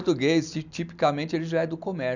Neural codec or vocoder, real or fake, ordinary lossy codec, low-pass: none; real; none; 7.2 kHz